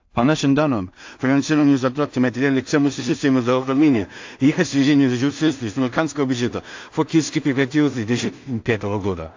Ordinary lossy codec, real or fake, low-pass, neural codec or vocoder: AAC, 48 kbps; fake; 7.2 kHz; codec, 16 kHz in and 24 kHz out, 0.4 kbps, LongCat-Audio-Codec, two codebook decoder